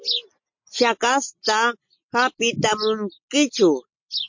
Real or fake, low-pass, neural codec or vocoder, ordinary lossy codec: real; 7.2 kHz; none; MP3, 48 kbps